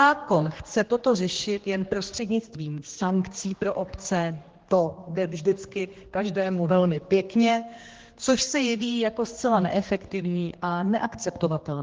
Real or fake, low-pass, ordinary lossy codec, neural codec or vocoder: fake; 7.2 kHz; Opus, 16 kbps; codec, 16 kHz, 2 kbps, X-Codec, HuBERT features, trained on general audio